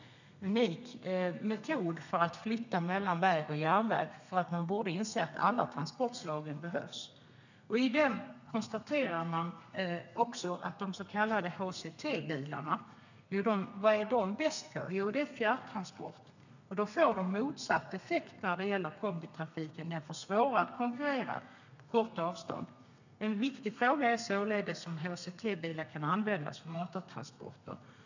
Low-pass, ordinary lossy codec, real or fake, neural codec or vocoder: 7.2 kHz; none; fake; codec, 32 kHz, 1.9 kbps, SNAC